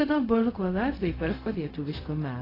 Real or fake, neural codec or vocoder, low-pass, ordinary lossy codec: fake; codec, 16 kHz, 0.4 kbps, LongCat-Audio-Codec; 5.4 kHz; AAC, 24 kbps